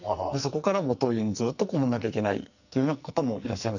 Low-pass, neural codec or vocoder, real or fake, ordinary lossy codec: 7.2 kHz; codec, 44.1 kHz, 2.6 kbps, SNAC; fake; none